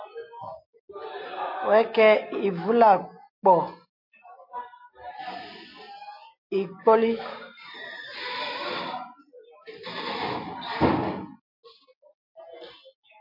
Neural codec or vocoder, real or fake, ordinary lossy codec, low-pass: none; real; MP3, 32 kbps; 5.4 kHz